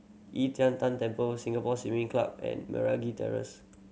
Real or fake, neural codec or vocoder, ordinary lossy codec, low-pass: real; none; none; none